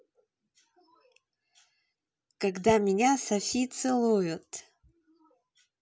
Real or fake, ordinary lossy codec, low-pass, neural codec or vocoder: real; none; none; none